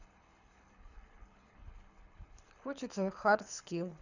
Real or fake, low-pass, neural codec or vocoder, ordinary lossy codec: fake; 7.2 kHz; codec, 24 kHz, 6 kbps, HILCodec; none